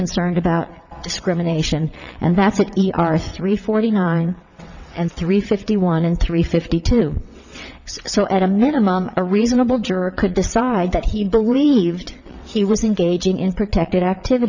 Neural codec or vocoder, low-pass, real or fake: vocoder, 22.05 kHz, 80 mel bands, WaveNeXt; 7.2 kHz; fake